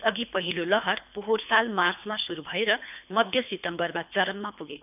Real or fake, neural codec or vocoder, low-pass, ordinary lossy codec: fake; codec, 24 kHz, 3 kbps, HILCodec; 3.6 kHz; none